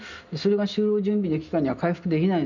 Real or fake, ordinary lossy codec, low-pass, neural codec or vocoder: real; none; 7.2 kHz; none